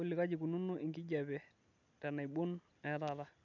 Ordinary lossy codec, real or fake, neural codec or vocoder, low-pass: none; real; none; 7.2 kHz